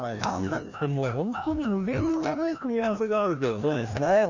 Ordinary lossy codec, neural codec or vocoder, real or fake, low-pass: none; codec, 16 kHz, 1 kbps, FreqCodec, larger model; fake; 7.2 kHz